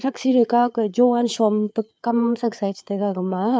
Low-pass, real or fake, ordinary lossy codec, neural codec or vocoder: none; fake; none; codec, 16 kHz, 4 kbps, FreqCodec, larger model